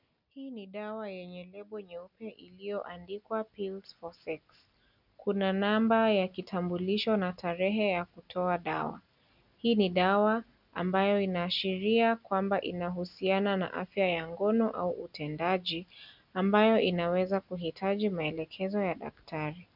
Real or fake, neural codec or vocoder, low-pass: real; none; 5.4 kHz